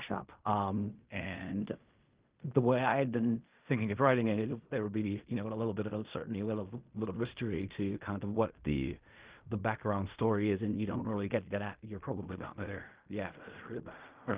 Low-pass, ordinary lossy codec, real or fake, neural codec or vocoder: 3.6 kHz; Opus, 32 kbps; fake; codec, 16 kHz in and 24 kHz out, 0.4 kbps, LongCat-Audio-Codec, fine tuned four codebook decoder